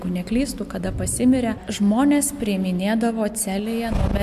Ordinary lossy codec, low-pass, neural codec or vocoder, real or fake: AAC, 96 kbps; 14.4 kHz; none; real